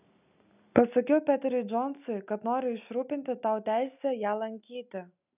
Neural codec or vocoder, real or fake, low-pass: none; real; 3.6 kHz